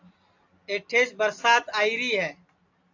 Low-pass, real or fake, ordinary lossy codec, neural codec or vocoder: 7.2 kHz; real; AAC, 48 kbps; none